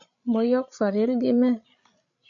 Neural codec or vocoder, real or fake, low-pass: codec, 16 kHz, 16 kbps, FreqCodec, larger model; fake; 7.2 kHz